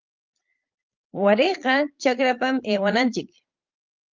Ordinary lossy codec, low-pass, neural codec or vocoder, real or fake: Opus, 24 kbps; 7.2 kHz; vocoder, 22.05 kHz, 80 mel bands, Vocos; fake